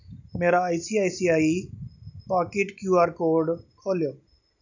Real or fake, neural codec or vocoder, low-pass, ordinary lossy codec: real; none; 7.2 kHz; none